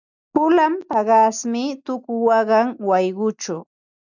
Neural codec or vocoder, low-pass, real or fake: none; 7.2 kHz; real